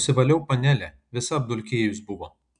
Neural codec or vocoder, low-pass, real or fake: none; 9.9 kHz; real